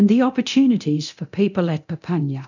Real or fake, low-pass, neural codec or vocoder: fake; 7.2 kHz; codec, 24 kHz, 0.9 kbps, DualCodec